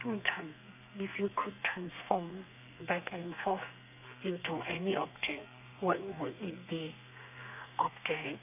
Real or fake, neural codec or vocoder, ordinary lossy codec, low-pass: fake; codec, 32 kHz, 1.9 kbps, SNAC; none; 3.6 kHz